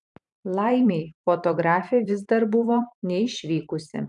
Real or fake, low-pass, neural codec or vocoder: fake; 10.8 kHz; vocoder, 44.1 kHz, 128 mel bands every 512 samples, BigVGAN v2